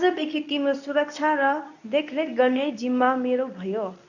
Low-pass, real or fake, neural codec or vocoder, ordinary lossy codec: 7.2 kHz; fake; codec, 24 kHz, 0.9 kbps, WavTokenizer, medium speech release version 2; none